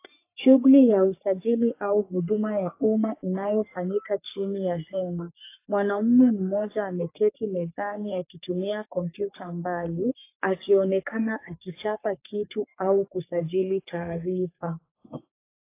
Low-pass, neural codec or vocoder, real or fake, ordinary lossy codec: 3.6 kHz; codec, 44.1 kHz, 3.4 kbps, Pupu-Codec; fake; AAC, 24 kbps